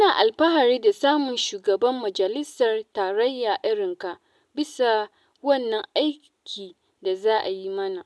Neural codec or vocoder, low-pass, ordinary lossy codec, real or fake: none; none; none; real